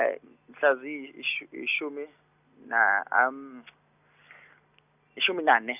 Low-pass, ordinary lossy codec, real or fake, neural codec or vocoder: 3.6 kHz; none; real; none